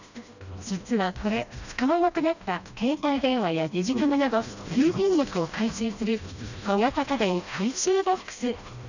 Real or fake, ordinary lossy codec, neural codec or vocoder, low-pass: fake; none; codec, 16 kHz, 1 kbps, FreqCodec, smaller model; 7.2 kHz